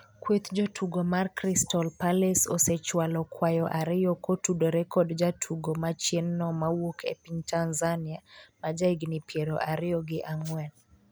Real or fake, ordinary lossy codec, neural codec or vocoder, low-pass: real; none; none; none